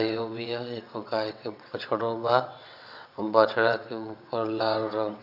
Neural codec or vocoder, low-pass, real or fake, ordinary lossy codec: vocoder, 22.05 kHz, 80 mel bands, WaveNeXt; 5.4 kHz; fake; none